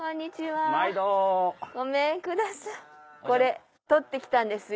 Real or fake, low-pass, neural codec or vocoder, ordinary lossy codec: real; none; none; none